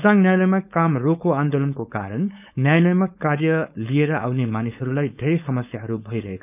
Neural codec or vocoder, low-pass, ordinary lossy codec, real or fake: codec, 16 kHz, 4.8 kbps, FACodec; 3.6 kHz; none; fake